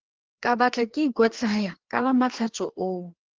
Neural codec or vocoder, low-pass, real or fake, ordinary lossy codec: codec, 16 kHz, 2 kbps, FreqCodec, larger model; 7.2 kHz; fake; Opus, 16 kbps